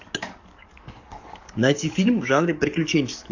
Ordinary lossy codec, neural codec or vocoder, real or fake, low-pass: AAC, 48 kbps; codec, 44.1 kHz, 7.8 kbps, DAC; fake; 7.2 kHz